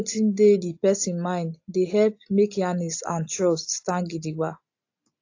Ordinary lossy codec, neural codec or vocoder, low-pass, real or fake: AAC, 48 kbps; none; 7.2 kHz; real